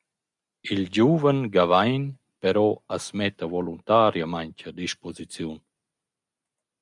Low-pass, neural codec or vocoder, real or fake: 10.8 kHz; none; real